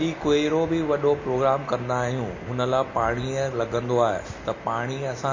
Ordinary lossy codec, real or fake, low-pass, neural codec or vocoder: MP3, 32 kbps; real; 7.2 kHz; none